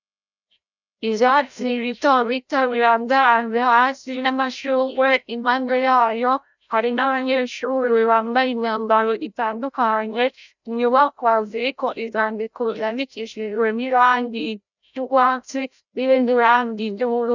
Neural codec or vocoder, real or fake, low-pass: codec, 16 kHz, 0.5 kbps, FreqCodec, larger model; fake; 7.2 kHz